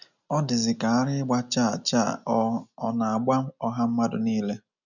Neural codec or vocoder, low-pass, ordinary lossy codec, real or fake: none; 7.2 kHz; none; real